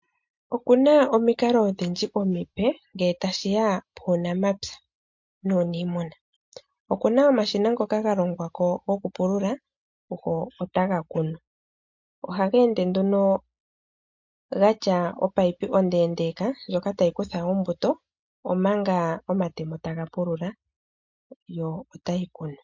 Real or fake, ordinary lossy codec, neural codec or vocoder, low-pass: real; MP3, 48 kbps; none; 7.2 kHz